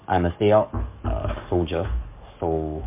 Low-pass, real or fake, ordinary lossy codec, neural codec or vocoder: 3.6 kHz; fake; MP3, 24 kbps; codec, 44.1 kHz, 7.8 kbps, DAC